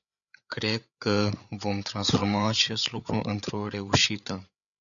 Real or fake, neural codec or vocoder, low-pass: fake; codec, 16 kHz, 8 kbps, FreqCodec, larger model; 7.2 kHz